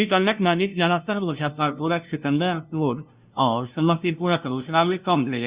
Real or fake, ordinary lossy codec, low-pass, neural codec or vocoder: fake; Opus, 64 kbps; 3.6 kHz; codec, 16 kHz, 0.5 kbps, FunCodec, trained on LibriTTS, 25 frames a second